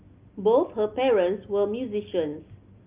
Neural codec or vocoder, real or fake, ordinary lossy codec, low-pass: none; real; Opus, 24 kbps; 3.6 kHz